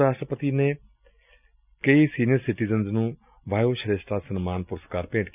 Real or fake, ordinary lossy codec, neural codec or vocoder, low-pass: real; none; none; 3.6 kHz